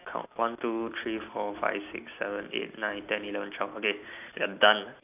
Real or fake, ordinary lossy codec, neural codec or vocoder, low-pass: real; none; none; 3.6 kHz